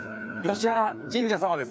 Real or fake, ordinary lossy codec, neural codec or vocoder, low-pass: fake; none; codec, 16 kHz, 2 kbps, FreqCodec, larger model; none